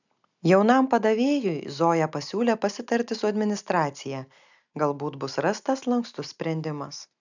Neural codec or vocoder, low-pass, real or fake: none; 7.2 kHz; real